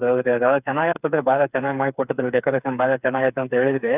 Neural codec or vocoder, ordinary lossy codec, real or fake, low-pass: codec, 16 kHz, 4 kbps, FreqCodec, smaller model; none; fake; 3.6 kHz